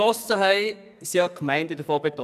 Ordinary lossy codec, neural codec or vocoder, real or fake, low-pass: none; codec, 44.1 kHz, 2.6 kbps, SNAC; fake; 14.4 kHz